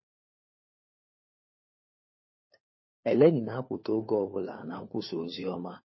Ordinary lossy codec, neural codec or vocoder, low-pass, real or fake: MP3, 24 kbps; codec, 16 kHz, 4 kbps, FunCodec, trained on LibriTTS, 50 frames a second; 7.2 kHz; fake